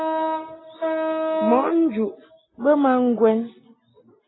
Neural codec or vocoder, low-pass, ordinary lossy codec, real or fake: none; 7.2 kHz; AAC, 16 kbps; real